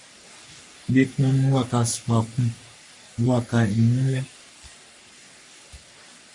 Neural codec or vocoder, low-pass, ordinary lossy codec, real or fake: codec, 44.1 kHz, 3.4 kbps, Pupu-Codec; 10.8 kHz; MP3, 48 kbps; fake